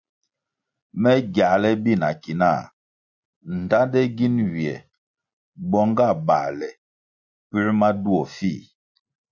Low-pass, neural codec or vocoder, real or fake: 7.2 kHz; none; real